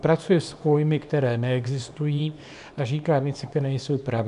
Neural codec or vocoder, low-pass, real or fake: codec, 24 kHz, 0.9 kbps, WavTokenizer, small release; 10.8 kHz; fake